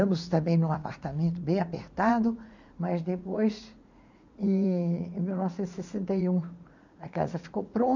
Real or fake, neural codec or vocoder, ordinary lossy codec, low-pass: fake; vocoder, 44.1 kHz, 80 mel bands, Vocos; none; 7.2 kHz